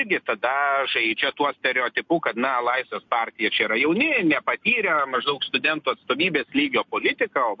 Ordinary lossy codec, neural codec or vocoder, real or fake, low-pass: MP3, 48 kbps; none; real; 7.2 kHz